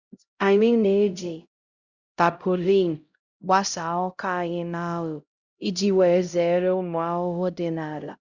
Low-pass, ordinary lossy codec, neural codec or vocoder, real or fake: 7.2 kHz; Opus, 64 kbps; codec, 16 kHz, 0.5 kbps, X-Codec, HuBERT features, trained on LibriSpeech; fake